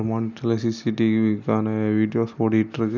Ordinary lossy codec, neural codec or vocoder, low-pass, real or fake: none; none; 7.2 kHz; real